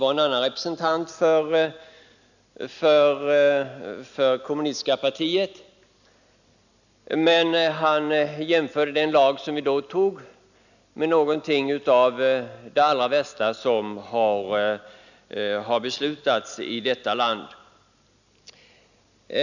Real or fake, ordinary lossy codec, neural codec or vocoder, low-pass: real; none; none; 7.2 kHz